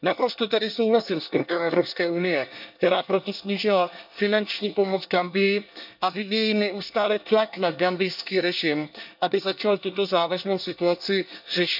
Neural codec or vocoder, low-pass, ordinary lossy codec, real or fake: codec, 24 kHz, 1 kbps, SNAC; 5.4 kHz; none; fake